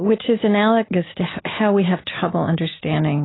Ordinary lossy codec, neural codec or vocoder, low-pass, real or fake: AAC, 16 kbps; none; 7.2 kHz; real